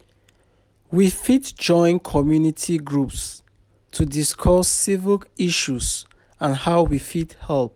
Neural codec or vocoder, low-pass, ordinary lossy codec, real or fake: none; 19.8 kHz; none; real